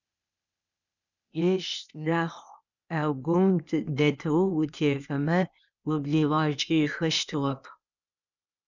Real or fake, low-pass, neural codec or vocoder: fake; 7.2 kHz; codec, 16 kHz, 0.8 kbps, ZipCodec